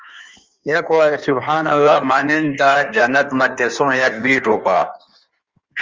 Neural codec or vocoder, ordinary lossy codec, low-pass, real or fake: codec, 16 kHz in and 24 kHz out, 1.1 kbps, FireRedTTS-2 codec; Opus, 32 kbps; 7.2 kHz; fake